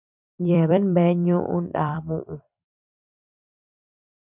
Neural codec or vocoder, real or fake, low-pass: vocoder, 44.1 kHz, 128 mel bands every 256 samples, BigVGAN v2; fake; 3.6 kHz